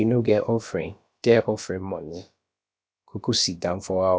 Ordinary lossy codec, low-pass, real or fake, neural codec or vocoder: none; none; fake; codec, 16 kHz, about 1 kbps, DyCAST, with the encoder's durations